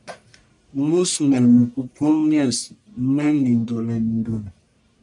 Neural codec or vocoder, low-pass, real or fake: codec, 44.1 kHz, 1.7 kbps, Pupu-Codec; 10.8 kHz; fake